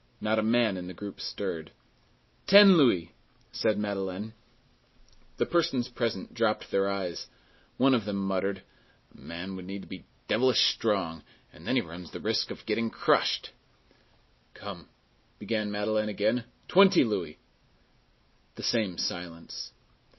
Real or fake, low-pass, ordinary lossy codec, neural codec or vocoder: real; 7.2 kHz; MP3, 24 kbps; none